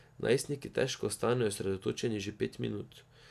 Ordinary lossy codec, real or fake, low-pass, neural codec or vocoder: none; real; 14.4 kHz; none